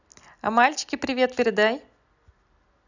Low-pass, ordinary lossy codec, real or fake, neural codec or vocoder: 7.2 kHz; none; real; none